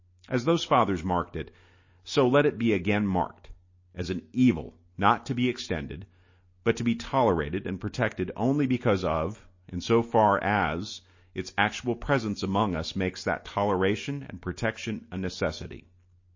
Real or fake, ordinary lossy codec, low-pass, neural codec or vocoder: real; MP3, 32 kbps; 7.2 kHz; none